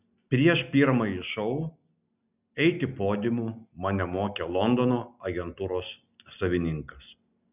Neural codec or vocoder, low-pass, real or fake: none; 3.6 kHz; real